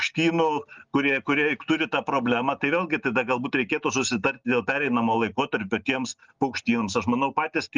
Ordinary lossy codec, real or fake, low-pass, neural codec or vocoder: Opus, 24 kbps; real; 7.2 kHz; none